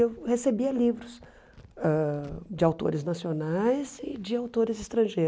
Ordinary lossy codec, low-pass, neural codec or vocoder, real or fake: none; none; none; real